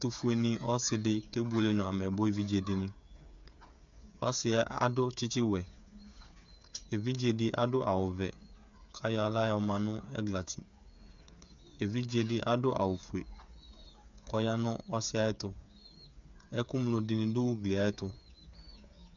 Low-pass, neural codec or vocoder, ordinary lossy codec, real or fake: 7.2 kHz; codec, 16 kHz, 8 kbps, FreqCodec, smaller model; MP3, 64 kbps; fake